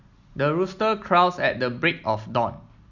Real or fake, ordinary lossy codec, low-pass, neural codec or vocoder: real; none; 7.2 kHz; none